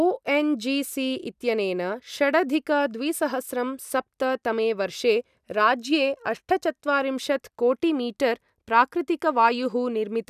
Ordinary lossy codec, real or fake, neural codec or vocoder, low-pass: none; real; none; 14.4 kHz